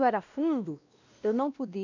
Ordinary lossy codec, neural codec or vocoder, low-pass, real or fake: none; codec, 16 kHz, 1 kbps, X-Codec, WavLM features, trained on Multilingual LibriSpeech; 7.2 kHz; fake